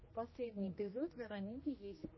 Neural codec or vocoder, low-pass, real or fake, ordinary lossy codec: codec, 16 kHz, 1 kbps, X-Codec, HuBERT features, trained on general audio; 7.2 kHz; fake; MP3, 24 kbps